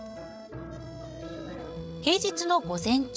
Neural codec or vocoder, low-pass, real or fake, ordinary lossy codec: codec, 16 kHz, 16 kbps, FreqCodec, larger model; none; fake; none